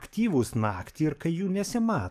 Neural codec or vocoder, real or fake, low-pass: codec, 44.1 kHz, 7.8 kbps, DAC; fake; 14.4 kHz